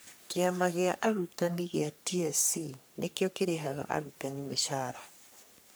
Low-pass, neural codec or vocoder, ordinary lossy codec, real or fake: none; codec, 44.1 kHz, 3.4 kbps, Pupu-Codec; none; fake